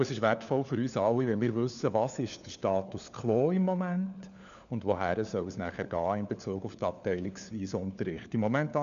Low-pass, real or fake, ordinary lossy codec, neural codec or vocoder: 7.2 kHz; fake; none; codec, 16 kHz, 4 kbps, FunCodec, trained on LibriTTS, 50 frames a second